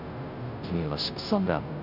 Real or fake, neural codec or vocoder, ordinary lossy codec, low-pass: fake; codec, 16 kHz, 0.5 kbps, FunCodec, trained on Chinese and English, 25 frames a second; none; 5.4 kHz